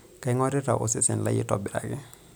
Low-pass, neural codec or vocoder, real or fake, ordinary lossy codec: none; none; real; none